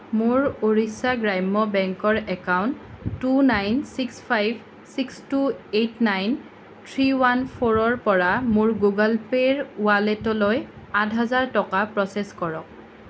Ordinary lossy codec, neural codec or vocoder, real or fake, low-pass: none; none; real; none